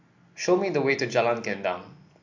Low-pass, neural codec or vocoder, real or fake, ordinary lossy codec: 7.2 kHz; none; real; MP3, 48 kbps